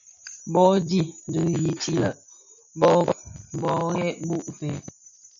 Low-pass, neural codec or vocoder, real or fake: 7.2 kHz; none; real